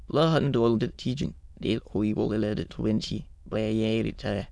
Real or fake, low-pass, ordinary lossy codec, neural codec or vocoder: fake; none; none; autoencoder, 22.05 kHz, a latent of 192 numbers a frame, VITS, trained on many speakers